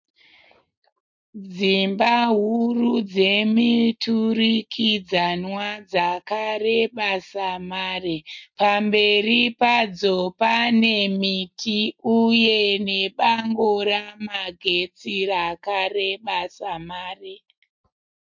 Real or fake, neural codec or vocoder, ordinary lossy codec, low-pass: real; none; MP3, 48 kbps; 7.2 kHz